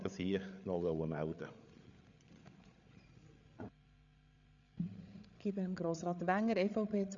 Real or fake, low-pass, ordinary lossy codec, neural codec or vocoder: fake; 7.2 kHz; none; codec, 16 kHz, 8 kbps, FreqCodec, larger model